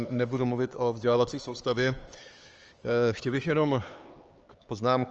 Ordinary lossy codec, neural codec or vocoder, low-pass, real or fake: Opus, 24 kbps; codec, 16 kHz, 4 kbps, X-Codec, HuBERT features, trained on LibriSpeech; 7.2 kHz; fake